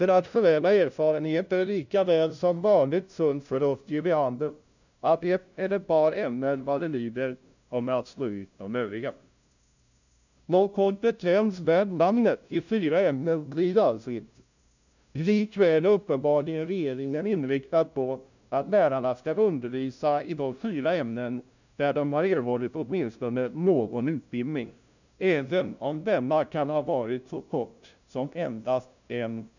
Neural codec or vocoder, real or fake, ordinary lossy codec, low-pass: codec, 16 kHz, 0.5 kbps, FunCodec, trained on LibriTTS, 25 frames a second; fake; none; 7.2 kHz